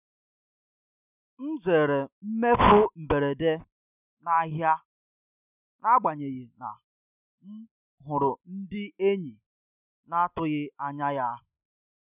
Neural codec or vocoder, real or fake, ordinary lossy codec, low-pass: none; real; none; 3.6 kHz